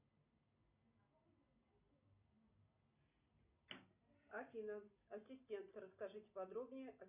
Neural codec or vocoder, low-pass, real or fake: none; 3.6 kHz; real